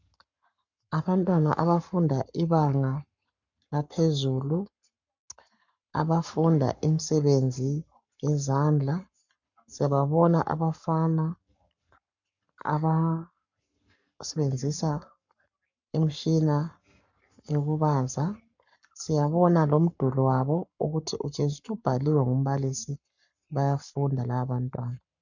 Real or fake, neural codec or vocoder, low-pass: fake; codec, 44.1 kHz, 7.8 kbps, Pupu-Codec; 7.2 kHz